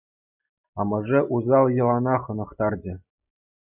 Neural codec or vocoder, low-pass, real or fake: none; 3.6 kHz; real